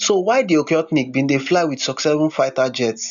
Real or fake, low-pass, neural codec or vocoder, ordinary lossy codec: real; 7.2 kHz; none; none